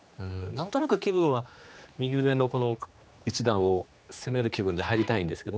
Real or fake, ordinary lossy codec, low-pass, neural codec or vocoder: fake; none; none; codec, 16 kHz, 2 kbps, X-Codec, HuBERT features, trained on general audio